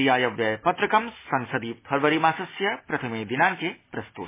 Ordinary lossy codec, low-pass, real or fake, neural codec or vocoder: MP3, 16 kbps; 3.6 kHz; real; none